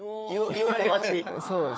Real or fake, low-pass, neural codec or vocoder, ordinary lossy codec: fake; none; codec, 16 kHz, 4 kbps, FunCodec, trained on Chinese and English, 50 frames a second; none